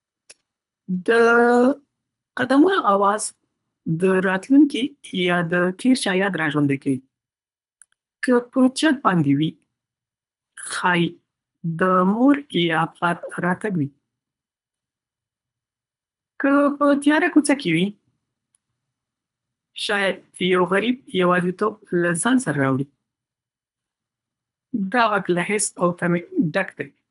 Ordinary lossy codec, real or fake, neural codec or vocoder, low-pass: none; fake; codec, 24 kHz, 3 kbps, HILCodec; 10.8 kHz